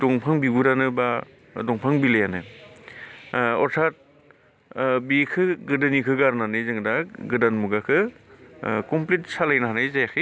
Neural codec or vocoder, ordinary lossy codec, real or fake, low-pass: none; none; real; none